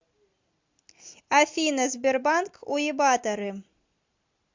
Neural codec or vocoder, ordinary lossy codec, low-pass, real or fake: none; MP3, 64 kbps; 7.2 kHz; real